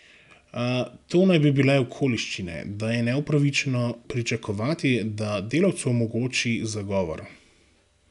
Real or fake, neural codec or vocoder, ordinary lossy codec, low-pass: real; none; none; 10.8 kHz